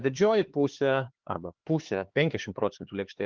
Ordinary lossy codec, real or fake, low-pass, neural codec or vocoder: Opus, 16 kbps; fake; 7.2 kHz; codec, 16 kHz, 4 kbps, X-Codec, HuBERT features, trained on LibriSpeech